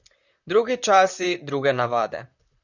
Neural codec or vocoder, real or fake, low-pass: vocoder, 44.1 kHz, 128 mel bands, Pupu-Vocoder; fake; 7.2 kHz